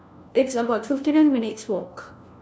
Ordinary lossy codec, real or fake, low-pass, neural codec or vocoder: none; fake; none; codec, 16 kHz, 1 kbps, FunCodec, trained on LibriTTS, 50 frames a second